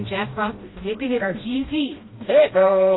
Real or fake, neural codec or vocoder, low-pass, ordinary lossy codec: fake; codec, 16 kHz, 1 kbps, FreqCodec, smaller model; 7.2 kHz; AAC, 16 kbps